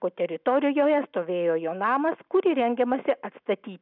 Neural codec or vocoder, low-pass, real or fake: none; 5.4 kHz; real